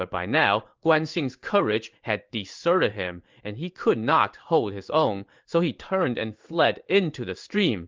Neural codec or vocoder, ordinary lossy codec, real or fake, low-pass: codec, 16 kHz in and 24 kHz out, 1 kbps, XY-Tokenizer; Opus, 32 kbps; fake; 7.2 kHz